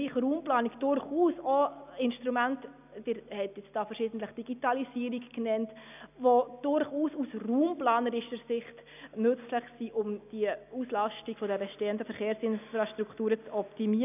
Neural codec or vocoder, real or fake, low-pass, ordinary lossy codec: none; real; 3.6 kHz; none